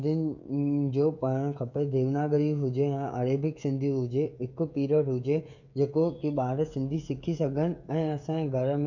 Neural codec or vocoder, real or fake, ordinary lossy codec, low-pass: codec, 16 kHz, 16 kbps, FreqCodec, smaller model; fake; none; 7.2 kHz